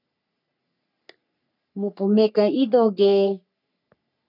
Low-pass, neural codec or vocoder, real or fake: 5.4 kHz; codec, 44.1 kHz, 3.4 kbps, Pupu-Codec; fake